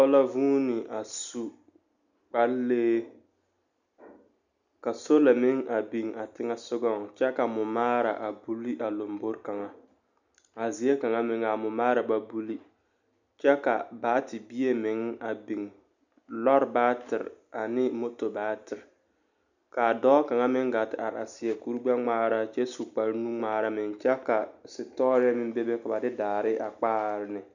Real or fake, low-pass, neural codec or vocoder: real; 7.2 kHz; none